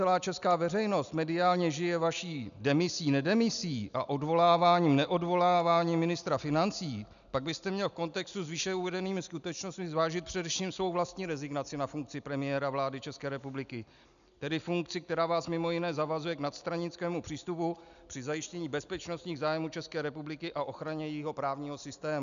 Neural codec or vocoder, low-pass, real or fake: none; 7.2 kHz; real